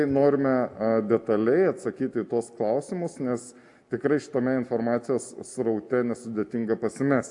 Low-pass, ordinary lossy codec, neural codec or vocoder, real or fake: 10.8 kHz; AAC, 64 kbps; none; real